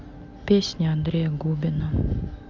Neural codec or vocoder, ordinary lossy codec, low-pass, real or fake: none; none; 7.2 kHz; real